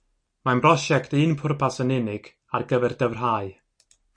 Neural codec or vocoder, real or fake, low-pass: none; real; 9.9 kHz